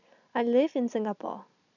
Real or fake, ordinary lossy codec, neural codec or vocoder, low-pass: real; none; none; 7.2 kHz